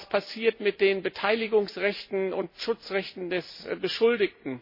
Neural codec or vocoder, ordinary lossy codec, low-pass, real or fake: none; MP3, 24 kbps; 5.4 kHz; real